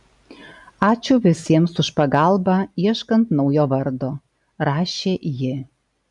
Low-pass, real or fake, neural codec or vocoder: 10.8 kHz; real; none